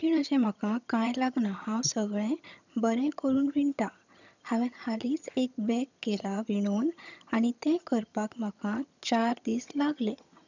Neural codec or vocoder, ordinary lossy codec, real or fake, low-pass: vocoder, 22.05 kHz, 80 mel bands, HiFi-GAN; none; fake; 7.2 kHz